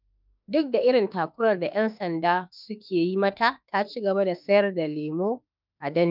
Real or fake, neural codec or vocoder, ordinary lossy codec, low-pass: fake; autoencoder, 48 kHz, 32 numbers a frame, DAC-VAE, trained on Japanese speech; none; 5.4 kHz